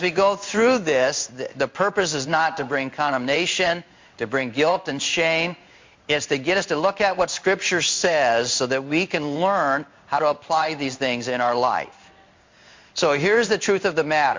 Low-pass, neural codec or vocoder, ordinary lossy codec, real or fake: 7.2 kHz; codec, 16 kHz in and 24 kHz out, 1 kbps, XY-Tokenizer; MP3, 48 kbps; fake